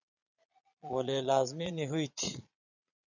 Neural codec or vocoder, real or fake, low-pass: vocoder, 22.05 kHz, 80 mel bands, Vocos; fake; 7.2 kHz